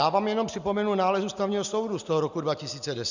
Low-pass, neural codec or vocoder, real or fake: 7.2 kHz; none; real